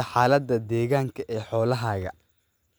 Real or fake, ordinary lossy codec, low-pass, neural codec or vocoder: real; none; none; none